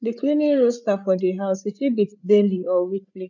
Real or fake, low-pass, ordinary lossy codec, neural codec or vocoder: fake; 7.2 kHz; none; codec, 16 kHz, 8 kbps, FreqCodec, larger model